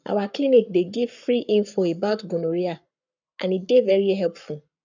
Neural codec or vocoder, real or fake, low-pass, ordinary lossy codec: codec, 44.1 kHz, 7.8 kbps, Pupu-Codec; fake; 7.2 kHz; none